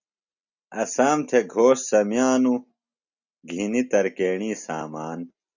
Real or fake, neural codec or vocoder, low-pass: real; none; 7.2 kHz